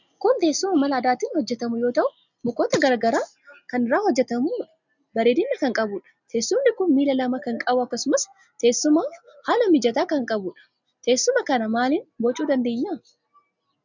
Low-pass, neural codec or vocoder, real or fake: 7.2 kHz; none; real